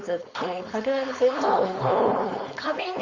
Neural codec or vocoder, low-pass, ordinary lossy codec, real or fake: codec, 16 kHz, 4.8 kbps, FACodec; 7.2 kHz; Opus, 32 kbps; fake